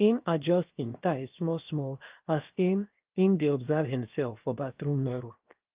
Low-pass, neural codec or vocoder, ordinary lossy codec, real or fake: 3.6 kHz; codec, 16 kHz, 0.7 kbps, FocalCodec; Opus, 32 kbps; fake